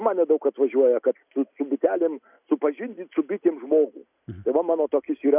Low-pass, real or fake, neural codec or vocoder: 3.6 kHz; real; none